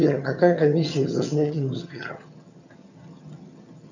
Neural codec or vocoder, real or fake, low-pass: vocoder, 22.05 kHz, 80 mel bands, HiFi-GAN; fake; 7.2 kHz